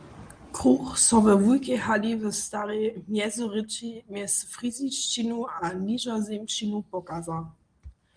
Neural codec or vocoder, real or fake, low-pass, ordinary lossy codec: none; real; 9.9 kHz; Opus, 24 kbps